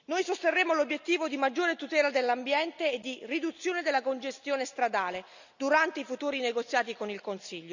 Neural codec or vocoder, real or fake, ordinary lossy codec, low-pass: none; real; none; 7.2 kHz